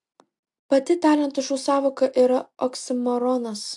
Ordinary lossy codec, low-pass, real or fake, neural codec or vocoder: AAC, 64 kbps; 10.8 kHz; real; none